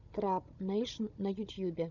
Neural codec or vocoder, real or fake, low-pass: codec, 16 kHz, 16 kbps, FunCodec, trained on Chinese and English, 50 frames a second; fake; 7.2 kHz